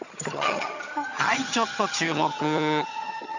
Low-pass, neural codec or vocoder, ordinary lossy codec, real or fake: 7.2 kHz; vocoder, 22.05 kHz, 80 mel bands, HiFi-GAN; none; fake